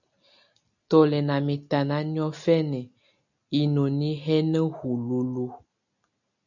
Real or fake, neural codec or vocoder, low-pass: real; none; 7.2 kHz